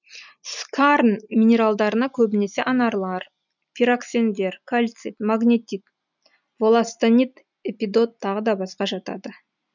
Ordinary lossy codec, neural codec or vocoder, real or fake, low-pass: none; none; real; 7.2 kHz